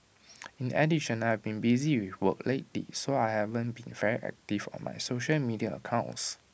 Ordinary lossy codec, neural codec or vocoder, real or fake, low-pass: none; none; real; none